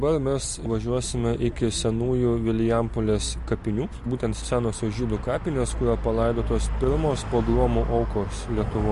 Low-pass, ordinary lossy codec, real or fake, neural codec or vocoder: 14.4 kHz; MP3, 48 kbps; real; none